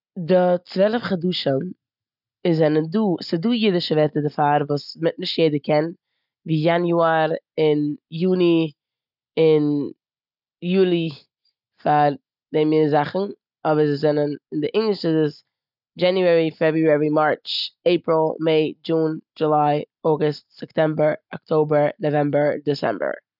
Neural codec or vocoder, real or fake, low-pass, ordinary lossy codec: none; real; 5.4 kHz; none